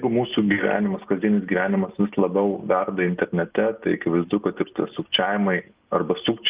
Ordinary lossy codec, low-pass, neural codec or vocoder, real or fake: Opus, 32 kbps; 3.6 kHz; none; real